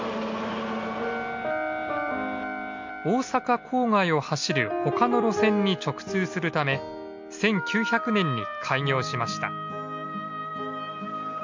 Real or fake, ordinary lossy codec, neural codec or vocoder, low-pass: real; MP3, 48 kbps; none; 7.2 kHz